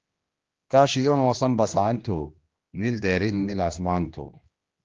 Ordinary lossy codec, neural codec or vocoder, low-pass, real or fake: Opus, 32 kbps; codec, 16 kHz, 1 kbps, X-Codec, HuBERT features, trained on general audio; 7.2 kHz; fake